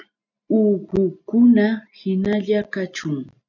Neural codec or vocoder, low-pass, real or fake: none; 7.2 kHz; real